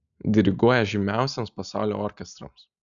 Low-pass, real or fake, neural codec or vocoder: 7.2 kHz; real; none